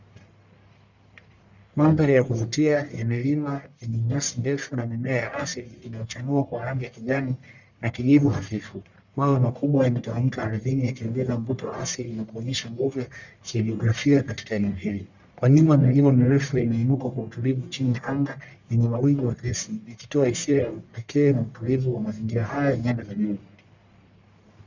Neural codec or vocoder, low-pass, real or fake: codec, 44.1 kHz, 1.7 kbps, Pupu-Codec; 7.2 kHz; fake